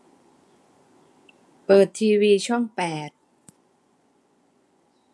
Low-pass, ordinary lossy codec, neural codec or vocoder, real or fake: none; none; vocoder, 24 kHz, 100 mel bands, Vocos; fake